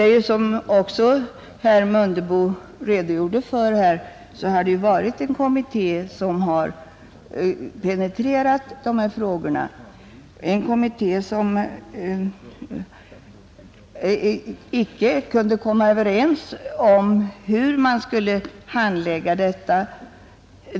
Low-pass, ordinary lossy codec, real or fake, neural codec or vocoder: none; none; real; none